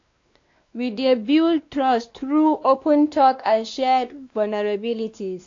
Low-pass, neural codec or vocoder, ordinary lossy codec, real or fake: 7.2 kHz; codec, 16 kHz, 2 kbps, X-Codec, WavLM features, trained on Multilingual LibriSpeech; AAC, 48 kbps; fake